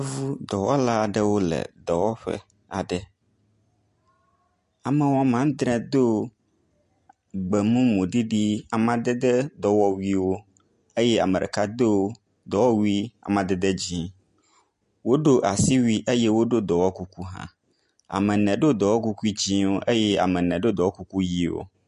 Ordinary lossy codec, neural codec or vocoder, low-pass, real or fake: MP3, 48 kbps; none; 14.4 kHz; real